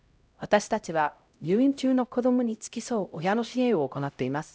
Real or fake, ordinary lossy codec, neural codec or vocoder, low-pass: fake; none; codec, 16 kHz, 0.5 kbps, X-Codec, HuBERT features, trained on LibriSpeech; none